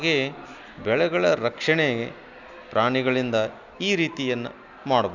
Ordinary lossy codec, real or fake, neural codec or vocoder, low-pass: none; real; none; 7.2 kHz